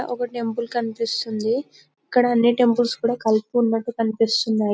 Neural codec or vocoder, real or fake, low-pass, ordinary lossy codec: none; real; none; none